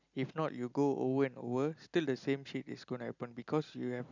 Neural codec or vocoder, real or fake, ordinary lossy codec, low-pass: none; real; none; 7.2 kHz